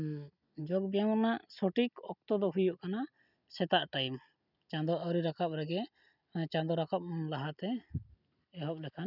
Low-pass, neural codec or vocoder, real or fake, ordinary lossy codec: 5.4 kHz; none; real; none